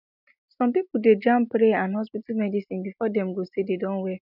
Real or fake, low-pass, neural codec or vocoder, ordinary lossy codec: real; 5.4 kHz; none; none